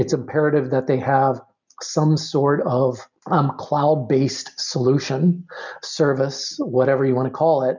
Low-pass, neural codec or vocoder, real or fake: 7.2 kHz; none; real